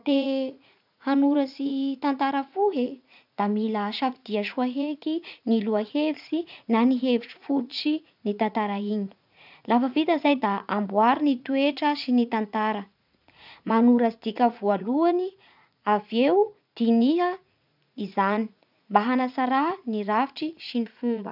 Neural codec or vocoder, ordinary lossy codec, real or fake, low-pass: vocoder, 44.1 kHz, 80 mel bands, Vocos; none; fake; 5.4 kHz